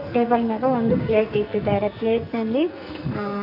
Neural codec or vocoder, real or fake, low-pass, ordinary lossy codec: codec, 44.1 kHz, 2.6 kbps, SNAC; fake; 5.4 kHz; none